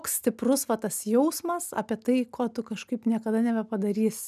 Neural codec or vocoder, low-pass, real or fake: none; 14.4 kHz; real